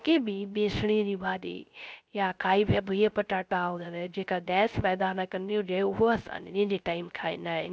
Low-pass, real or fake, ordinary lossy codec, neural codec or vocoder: none; fake; none; codec, 16 kHz, 0.3 kbps, FocalCodec